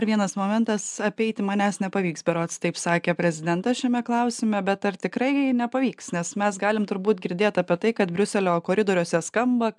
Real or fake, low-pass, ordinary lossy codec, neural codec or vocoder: real; 10.8 kHz; MP3, 96 kbps; none